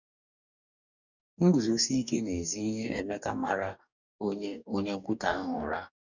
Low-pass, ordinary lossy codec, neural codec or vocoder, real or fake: 7.2 kHz; none; codec, 44.1 kHz, 2.6 kbps, DAC; fake